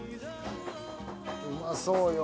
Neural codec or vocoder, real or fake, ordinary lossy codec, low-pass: none; real; none; none